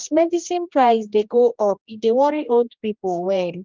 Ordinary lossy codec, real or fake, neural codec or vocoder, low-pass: Opus, 24 kbps; fake; codec, 16 kHz, 1 kbps, X-Codec, HuBERT features, trained on general audio; 7.2 kHz